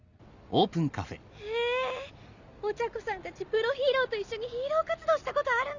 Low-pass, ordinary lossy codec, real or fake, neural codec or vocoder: 7.2 kHz; none; real; none